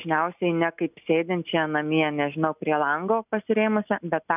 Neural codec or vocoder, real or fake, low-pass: none; real; 3.6 kHz